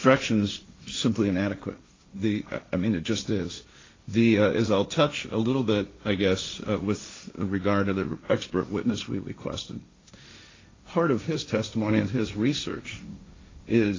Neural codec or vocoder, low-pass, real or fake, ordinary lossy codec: codec, 16 kHz, 1.1 kbps, Voila-Tokenizer; 7.2 kHz; fake; AAC, 32 kbps